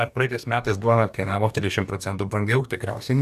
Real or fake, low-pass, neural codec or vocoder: fake; 14.4 kHz; codec, 44.1 kHz, 2.6 kbps, DAC